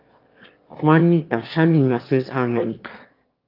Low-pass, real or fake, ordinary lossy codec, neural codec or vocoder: 5.4 kHz; fake; Opus, 24 kbps; autoencoder, 22.05 kHz, a latent of 192 numbers a frame, VITS, trained on one speaker